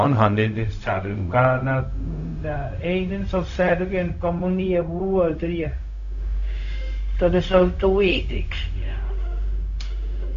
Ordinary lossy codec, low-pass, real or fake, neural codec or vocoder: none; 7.2 kHz; fake; codec, 16 kHz, 0.4 kbps, LongCat-Audio-Codec